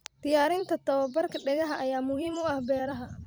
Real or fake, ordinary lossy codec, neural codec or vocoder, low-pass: fake; none; vocoder, 44.1 kHz, 128 mel bands every 256 samples, BigVGAN v2; none